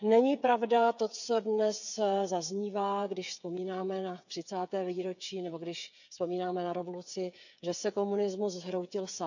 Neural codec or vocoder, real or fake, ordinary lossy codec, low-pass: codec, 16 kHz, 8 kbps, FreqCodec, smaller model; fake; none; 7.2 kHz